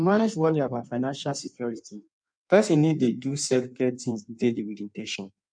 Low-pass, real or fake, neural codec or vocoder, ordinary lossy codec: 9.9 kHz; fake; codec, 16 kHz in and 24 kHz out, 1.1 kbps, FireRedTTS-2 codec; none